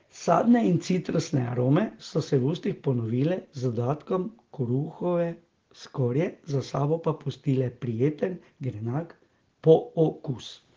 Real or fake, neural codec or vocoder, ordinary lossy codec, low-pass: real; none; Opus, 16 kbps; 7.2 kHz